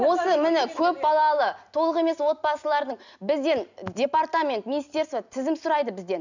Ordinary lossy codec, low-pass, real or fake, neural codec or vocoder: none; 7.2 kHz; real; none